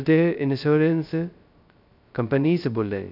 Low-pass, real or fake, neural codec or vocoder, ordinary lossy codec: 5.4 kHz; fake; codec, 16 kHz, 0.2 kbps, FocalCodec; none